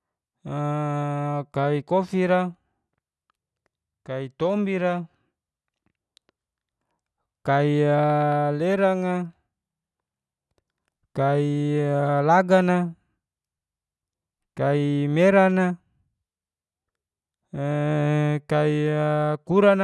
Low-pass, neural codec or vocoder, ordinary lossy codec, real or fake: none; none; none; real